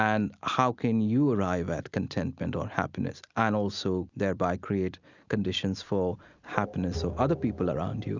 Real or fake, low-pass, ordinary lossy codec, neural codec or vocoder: real; 7.2 kHz; Opus, 64 kbps; none